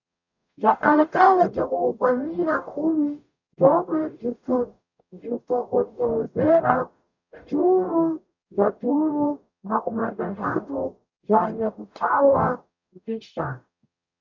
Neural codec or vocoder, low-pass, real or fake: codec, 44.1 kHz, 0.9 kbps, DAC; 7.2 kHz; fake